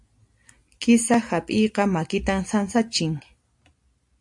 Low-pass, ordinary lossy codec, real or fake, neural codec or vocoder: 10.8 kHz; AAC, 64 kbps; real; none